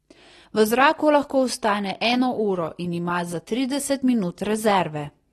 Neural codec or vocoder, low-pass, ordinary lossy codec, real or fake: none; 19.8 kHz; AAC, 32 kbps; real